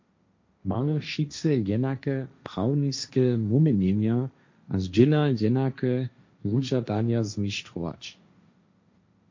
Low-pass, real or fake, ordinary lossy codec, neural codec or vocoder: 7.2 kHz; fake; MP3, 48 kbps; codec, 16 kHz, 1.1 kbps, Voila-Tokenizer